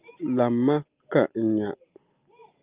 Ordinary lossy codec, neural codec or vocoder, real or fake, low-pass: Opus, 24 kbps; none; real; 3.6 kHz